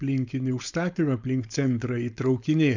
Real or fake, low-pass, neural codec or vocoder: fake; 7.2 kHz; codec, 16 kHz, 4.8 kbps, FACodec